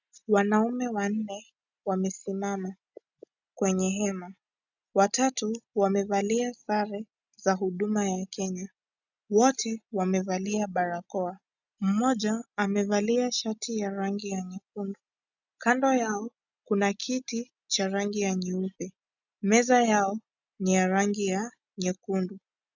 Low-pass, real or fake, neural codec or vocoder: 7.2 kHz; real; none